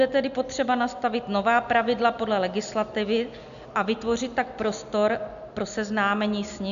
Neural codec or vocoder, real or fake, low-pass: none; real; 7.2 kHz